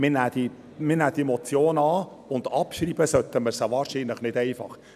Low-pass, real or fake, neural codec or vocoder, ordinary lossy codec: 14.4 kHz; real; none; none